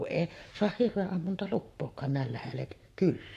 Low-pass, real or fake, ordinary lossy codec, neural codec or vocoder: 14.4 kHz; fake; AAC, 64 kbps; codec, 44.1 kHz, 7.8 kbps, Pupu-Codec